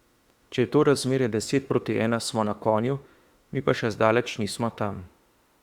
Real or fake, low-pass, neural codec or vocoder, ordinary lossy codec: fake; 19.8 kHz; autoencoder, 48 kHz, 32 numbers a frame, DAC-VAE, trained on Japanese speech; Opus, 64 kbps